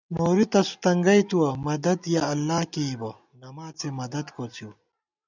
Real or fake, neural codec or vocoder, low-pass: real; none; 7.2 kHz